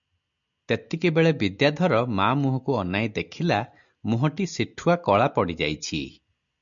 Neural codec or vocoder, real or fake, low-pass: none; real; 7.2 kHz